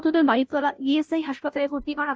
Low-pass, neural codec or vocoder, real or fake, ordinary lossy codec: none; codec, 16 kHz, 0.5 kbps, FunCodec, trained on Chinese and English, 25 frames a second; fake; none